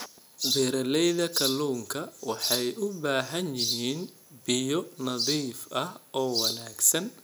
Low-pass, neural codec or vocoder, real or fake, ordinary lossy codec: none; none; real; none